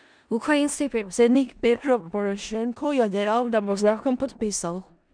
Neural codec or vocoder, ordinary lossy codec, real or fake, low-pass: codec, 16 kHz in and 24 kHz out, 0.4 kbps, LongCat-Audio-Codec, four codebook decoder; none; fake; 9.9 kHz